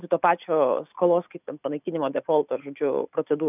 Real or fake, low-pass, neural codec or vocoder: real; 3.6 kHz; none